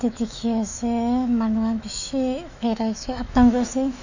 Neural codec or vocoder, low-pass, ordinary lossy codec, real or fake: autoencoder, 48 kHz, 128 numbers a frame, DAC-VAE, trained on Japanese speech; 7.2 kHz; none; fake